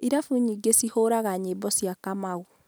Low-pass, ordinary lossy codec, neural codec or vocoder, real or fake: none; none; none; real